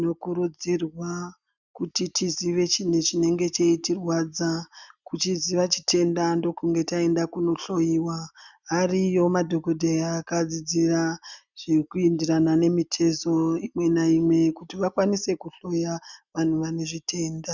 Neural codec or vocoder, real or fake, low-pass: none; real; 7.2 kHz